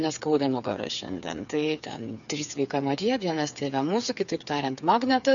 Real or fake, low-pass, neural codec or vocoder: fake; 7.2 kHz; codec, 16 kHz, 8 kbps, FreqCodec, smaller model